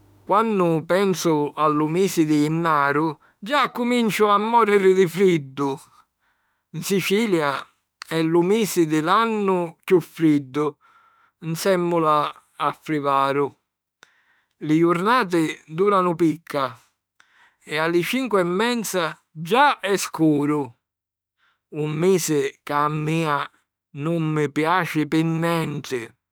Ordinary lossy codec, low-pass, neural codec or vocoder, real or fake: none; none; autoencoder, 48 kHz, 32 numbers a frame, DAC-VAE, trained on Japanese speech; fake